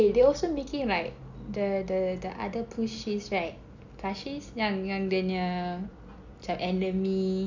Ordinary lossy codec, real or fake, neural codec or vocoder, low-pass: none; real; none; 7.2 kHz